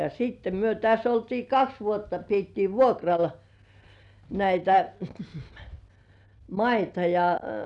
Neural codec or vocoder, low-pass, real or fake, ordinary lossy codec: none; 10.8 kHz; real; none